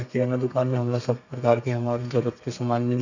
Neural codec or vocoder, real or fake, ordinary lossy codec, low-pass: codec, 32 kHz, 1.9 kbps, SNAC; fake; none; 7.2 kHz